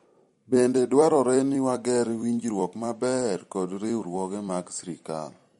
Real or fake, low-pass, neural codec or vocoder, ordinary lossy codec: fake; 19.8 kHz; vocoder, 44.1 kHz, 128 mel bands every 256 samples, BigVGAN v2; MP3, 48 kbps